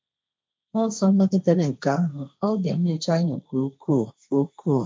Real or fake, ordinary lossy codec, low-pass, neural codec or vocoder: fake; none; none; codec, 16 kHz, 1.1 kbps, Voila-Tokenizer